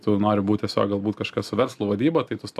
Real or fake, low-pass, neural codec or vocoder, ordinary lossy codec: real; 14.4 kHz; none; AAC, 96 kbps